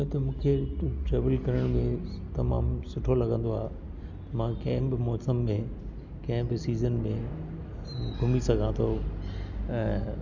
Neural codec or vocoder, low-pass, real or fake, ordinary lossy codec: none; 7.2 kHz; real; none